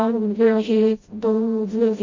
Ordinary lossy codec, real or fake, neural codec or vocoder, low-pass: MP3, 32 kbps; fake; codec, 16 kHz, 0.5 kbps, FreqCodec, smaller model; 7.2 kHz